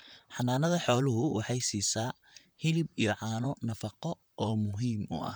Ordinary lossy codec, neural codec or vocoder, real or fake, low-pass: none; vocoder, 44.1 kHz, 128 mel bands, Pupu-Vocoder; fake; none